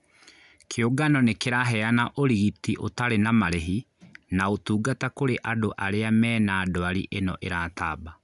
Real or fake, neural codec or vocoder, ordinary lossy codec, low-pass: real; none; none; 10.8 kHz